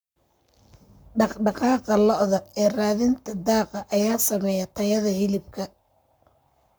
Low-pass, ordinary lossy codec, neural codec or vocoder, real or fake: none; none; codec, 44.1 kHz, 7.8 kbps, Pupu-Codec; fake